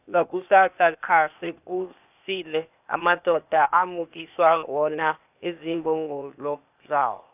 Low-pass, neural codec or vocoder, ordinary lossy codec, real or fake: 3.6 kHz; codec, 16 kHz, 0.8 kbps, ZipCodec; none; fake